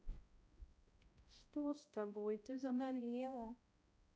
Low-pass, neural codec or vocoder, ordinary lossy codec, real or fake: none; codec, 16 kHz, 0.5 kbps, X-Codec, HuBERT features, trained on balanced general audio; none; fake